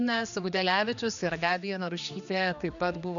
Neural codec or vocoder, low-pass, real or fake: codec, 16 kHz, 2 kbps, X-Codec, HuBERT features, trained on general audio; 7.2 kHz; fake